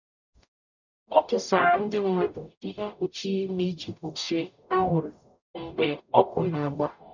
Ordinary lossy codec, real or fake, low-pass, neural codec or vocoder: none; fake; 7.2 kHz; codec, 44.1 kHz, 0.9 kbps, DAC